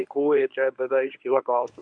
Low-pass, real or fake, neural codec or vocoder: 9.9 kHz; fake; codec, 24 kHz, 0.9 kbps, WavTokenizer, medium speech release version 1